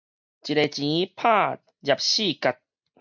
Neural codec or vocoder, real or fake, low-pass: none; real; 7.2 kHz